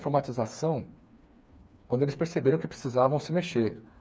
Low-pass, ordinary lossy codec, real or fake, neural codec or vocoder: none; none; fake; codec, 16 kHz, 4 kbps, FreqCodec, smaller model